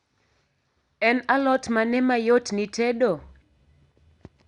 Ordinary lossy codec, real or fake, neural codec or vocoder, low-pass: Opus, 64 kbps; real; none; 10.8 kHz